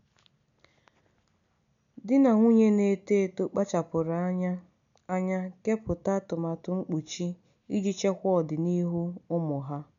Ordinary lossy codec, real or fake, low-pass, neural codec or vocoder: none; real; 7.2 kHz; none